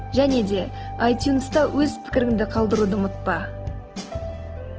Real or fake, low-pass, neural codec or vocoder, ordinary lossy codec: real; 7.2 kHz; none; Opus, 16 kbps